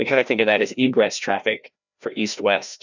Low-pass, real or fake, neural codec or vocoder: 7.2 kHz; fake; codec, 16 kHz, 1 kbps, FreqCodec, larger model